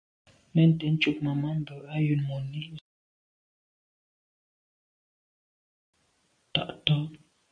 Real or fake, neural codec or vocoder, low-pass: real; none; 9.9 kHz